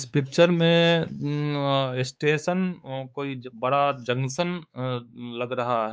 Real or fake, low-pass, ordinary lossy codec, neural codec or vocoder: fake; none; none; codec, 16 kHz, 4 kbps, X-Codec, WavLM features, trained on Multilingual LibriSpeech